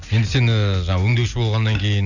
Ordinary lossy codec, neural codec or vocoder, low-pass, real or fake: none; none; 7.2 kHz; real